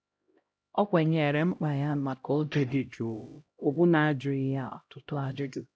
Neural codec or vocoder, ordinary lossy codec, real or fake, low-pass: codec, 16 kHz, 0.5 kbps, X-Codec, HuBERT features, trained on LibriSpeech; none; fake; none